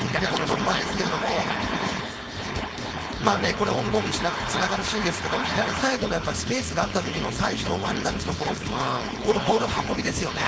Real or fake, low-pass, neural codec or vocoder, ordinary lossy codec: fake; none; codec, 16 kHz, 4.8 kbps, FACodec; none